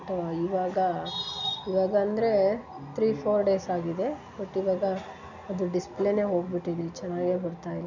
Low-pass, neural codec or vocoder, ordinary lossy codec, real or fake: 7.2 kHz; none; none; real